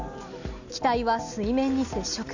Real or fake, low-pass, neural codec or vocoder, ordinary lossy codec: real; 7.2 kHz; none; none